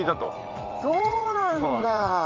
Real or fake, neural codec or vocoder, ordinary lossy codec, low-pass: fake; vocoder, 44.1 kHz, 80 mel bands, Vocos; Opus, 32 kbps; 7.2 kHz